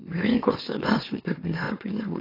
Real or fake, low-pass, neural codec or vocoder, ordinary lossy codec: fake; 5.4 kHz; autoencoder, 44.1 kHz, a latent of 192 numbers a frame, MeloTTS; AAC, 24 kbps